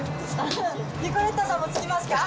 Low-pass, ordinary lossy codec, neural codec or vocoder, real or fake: none; none; none; real